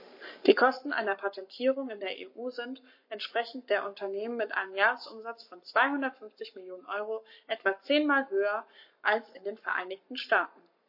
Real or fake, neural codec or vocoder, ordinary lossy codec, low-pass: fake; codec, 44.1 kHz, 7.8 kbps, Pupu-Codec; MP3, 32 kbps; 5.4 kHz